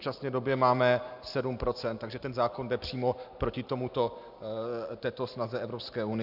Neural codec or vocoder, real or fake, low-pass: none; real; 5.4 kHz